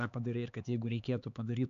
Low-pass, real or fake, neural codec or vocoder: 7.2 kHz; fake; codec, 16 kHz, 4 kbps, X-Codec, HuBERT features, trained on LibriSpeech